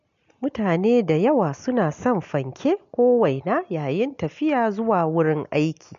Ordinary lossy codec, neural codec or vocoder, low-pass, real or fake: MP3, 48 kbps; none; 7.2 kHz; real